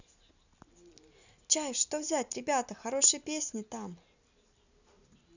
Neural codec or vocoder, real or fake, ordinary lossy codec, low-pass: none; real; none; 7.2 kHz